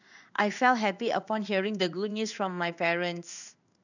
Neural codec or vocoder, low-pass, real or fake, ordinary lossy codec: codec, 16 kHz in and 24 kHz out, 1 kbps, XY-Tokenizer; 7.2 kHz; fake; none